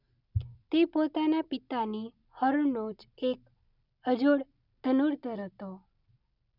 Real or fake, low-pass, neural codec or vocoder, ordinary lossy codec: real; 5.4 kHz; none; none